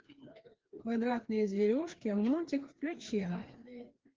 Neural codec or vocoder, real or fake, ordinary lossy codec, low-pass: codec, 16 kHz, 2 kbps, FreqCodec, larger model; fake; Opus, 16 kbps; 7.2 kHz